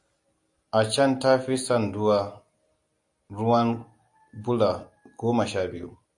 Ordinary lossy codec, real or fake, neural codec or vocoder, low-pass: AAC, 64 kbps; real; none; 10.8 kHz